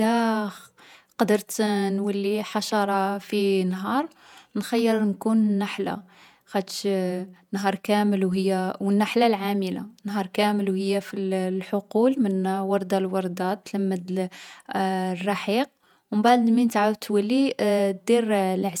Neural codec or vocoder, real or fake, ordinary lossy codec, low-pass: vocoder, 48 kHz, 128 mel bands, Vocos; fake; none; 19.8 kHz